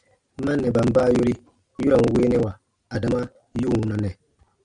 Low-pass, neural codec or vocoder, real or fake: 9.9 kHz; none; real